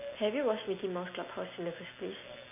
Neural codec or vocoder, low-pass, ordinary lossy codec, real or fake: none; 3.6 kHz; MP3, 24 kbps; real